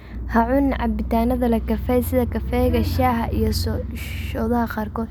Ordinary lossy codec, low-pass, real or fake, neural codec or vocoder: none; none; real; none